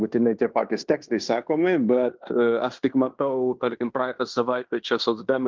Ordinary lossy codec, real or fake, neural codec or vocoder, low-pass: Opus, 24 kbps; fake; codec, 16 kHz in and 24 kHz out, 0.9 kbps, LongCat-Audio-Codec, fine tuned four codebook decoder; 7.2 kHz